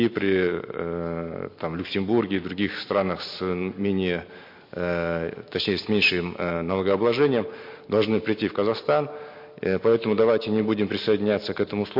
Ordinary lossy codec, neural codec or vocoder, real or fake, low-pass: MP3, 32 kbps; none; real; 5.4 kHz